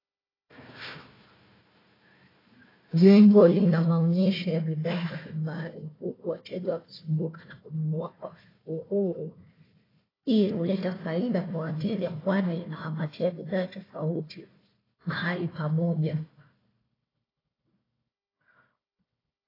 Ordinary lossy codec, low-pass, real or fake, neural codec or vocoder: AAC, 24 kbps; 5.4 kHz; fake; codec, 16 kHz, 1 kbps, FunCodec, trained on Chinese and English, 50 frames a second